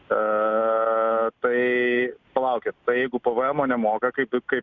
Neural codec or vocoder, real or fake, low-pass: none; real; 7.2 kHz